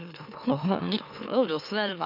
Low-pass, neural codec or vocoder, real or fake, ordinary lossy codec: 5.4 kHz; autoencoder, 44.1 kHz, a latent of 192 numbers a frame, MeloTTS; fake; none